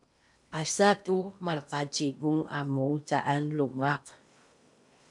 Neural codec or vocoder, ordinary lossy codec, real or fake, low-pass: codec, 16 kHz in and 24 kHz out, 0.8 kbps, FocalCodec, streaming, 65536 codes; MP3, 96 kbps; fake; 10.8 kHz